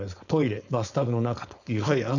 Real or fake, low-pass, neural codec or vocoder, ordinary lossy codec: fake; 7.2 kHz; codec, 16 kHz, 4.8 kbps, FACodec; none